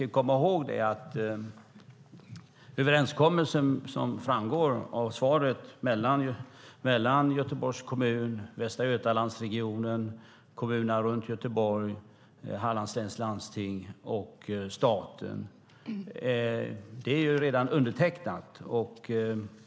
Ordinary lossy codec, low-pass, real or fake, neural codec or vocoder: none; none; real; none